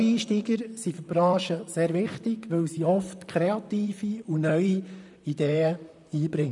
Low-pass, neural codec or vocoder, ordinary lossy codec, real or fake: 10.8 kHz; vocoder, 44.1 kHz, 128 mel bands, Pupu-Vocoder; none; fake